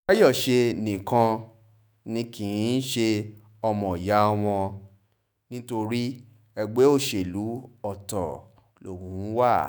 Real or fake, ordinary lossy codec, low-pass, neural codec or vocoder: fake; none; none; autoencoder, 48 kHz, 128 numbers a frame, DAC-VAE, trained on Japanese speech